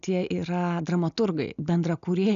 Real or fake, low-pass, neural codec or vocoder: real; 7.2 kHz; none